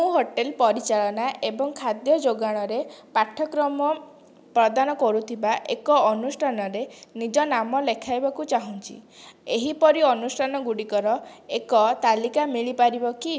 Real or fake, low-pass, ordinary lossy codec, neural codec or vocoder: real; none; none; none